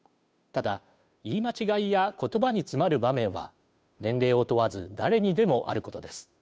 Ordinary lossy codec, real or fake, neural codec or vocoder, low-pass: none; fake; codec, 16 kHz, 2 kbps, FunCodec, trained on Chinese and English, 25 frames a second; none